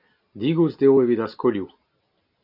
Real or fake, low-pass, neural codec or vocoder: real; 5.4 kHz; none